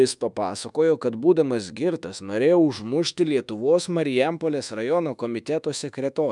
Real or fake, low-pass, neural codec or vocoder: fake; 10.8 kHz; codec, 24 kHz, 1.2 kbps, DualCodec